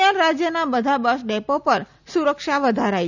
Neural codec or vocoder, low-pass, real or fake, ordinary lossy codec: none; 7.2 kHz; real; none